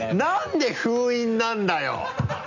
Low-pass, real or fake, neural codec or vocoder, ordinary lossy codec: 7.2 kHz; real; none; none